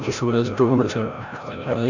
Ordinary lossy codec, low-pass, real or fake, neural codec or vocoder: none; 7.2 kHz; fake; codec, 16 kHz, 0.5 kbps, FreqCodec, larger model